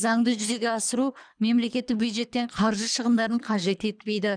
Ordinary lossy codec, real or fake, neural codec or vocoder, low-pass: none; fake; codec, 24 kHz, 3 kbps, HILCodec; 9.9 kHz